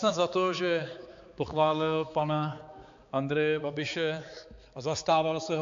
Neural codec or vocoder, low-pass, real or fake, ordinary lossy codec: codec, 16 kHz, 4 kbps, X-Codec, HuBERT features, trained on balanced general audio; 7.2 kHz; fake; AAC, 64 kbps